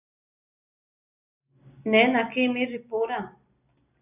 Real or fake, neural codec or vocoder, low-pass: real; none; 3.6 kHz